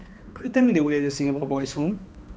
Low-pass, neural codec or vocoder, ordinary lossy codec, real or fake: none; codec, 16 kHz, 2 kbps, X-Codec, HuBERT features, trained on balanced general audio; none; fake